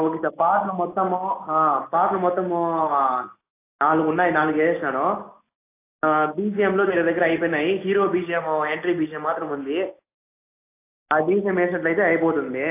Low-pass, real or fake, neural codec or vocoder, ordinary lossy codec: 3.6 kHz; real; none; AAC, 24 kbps